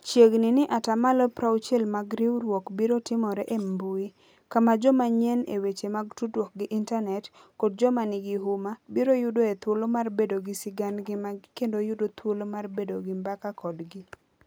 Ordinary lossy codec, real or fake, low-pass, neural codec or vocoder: none; real; none; none